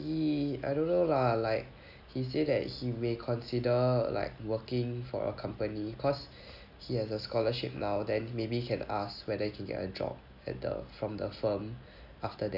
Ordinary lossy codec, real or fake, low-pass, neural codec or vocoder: none; real; 5.4 kHz; none